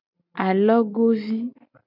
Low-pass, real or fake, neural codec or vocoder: 5.4 kHz; real; none